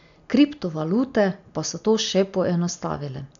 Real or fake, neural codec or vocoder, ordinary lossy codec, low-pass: real; none; none; 7.2 kHz